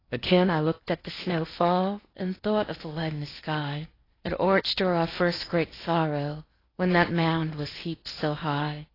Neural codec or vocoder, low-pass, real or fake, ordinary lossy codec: codec, 16 kHz in and 24 kHz out, 0.8 kbps, FocalCodec, streaming, 65536 codes; 5.4 kHz; fake; AAC, 24 kbps